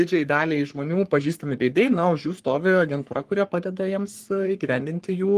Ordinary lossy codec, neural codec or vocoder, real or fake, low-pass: Opus, 24 kbps; codec, 44.1 kHz, 3.4 kbps, Pupu-Codec; fake; 14.4 kHz